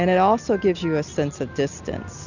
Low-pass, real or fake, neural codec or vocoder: 7.2 kHz; real; none